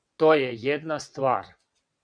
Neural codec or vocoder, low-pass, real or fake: vocoder, 22.05 kHz, 80 mel bands, WaveNeXt; 9.9 kHz; fake